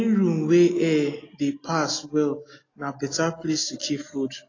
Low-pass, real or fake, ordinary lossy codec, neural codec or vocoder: 7.2 kHz; real; AAC, 32 kbps; none